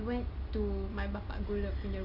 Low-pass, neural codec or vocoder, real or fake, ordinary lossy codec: 5.4 kHz; none; real; none